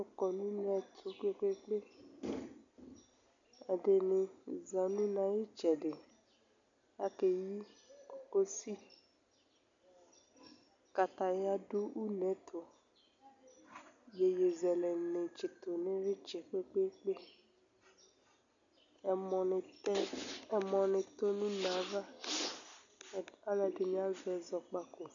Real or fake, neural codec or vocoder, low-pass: real; none; 7.2 kHz